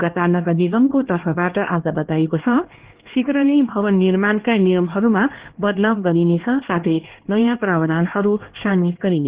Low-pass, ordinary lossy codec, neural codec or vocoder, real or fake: 3.6 kHz; Opus, 16 kbps; codec, 16 kHz, 2 kbps, X-Codec, HuBERT features, trained on LibriSpeech; fake